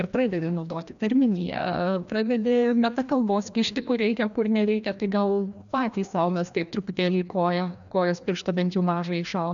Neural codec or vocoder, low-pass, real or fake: codec, 16 kHz, 1 kbps, FreqCodec, larger model; 7.2 kHz; fake